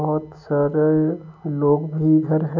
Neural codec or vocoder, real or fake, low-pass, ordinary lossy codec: none; real; 7.2 kHz; none